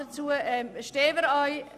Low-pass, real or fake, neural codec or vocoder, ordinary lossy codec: 14.4 kHz; real; none; none